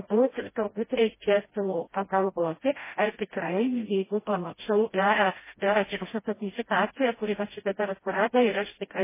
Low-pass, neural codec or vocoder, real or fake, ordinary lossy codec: 3.6 kHz; codec, 16 kHz, 0.5 kbps, FreqCodec, smaller model; fake; MP3, 16 kbps